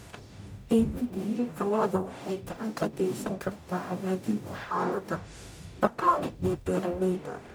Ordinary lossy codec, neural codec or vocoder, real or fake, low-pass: none; codec, 44.1 kHz, 0.9 kbps, DAC; fake; none